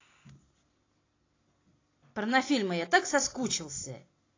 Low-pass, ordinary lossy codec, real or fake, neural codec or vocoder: 7.2 kHz; AAC, 32 kbps; real; none